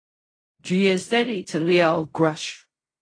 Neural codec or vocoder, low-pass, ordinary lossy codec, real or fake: codec, 16 kHz in and 24 kHz out, 0.4 kbps, LongCat-Audio-Codec, fine tuned four codebook decoder; 9.9 kHz; AAC, 32 kbps; fake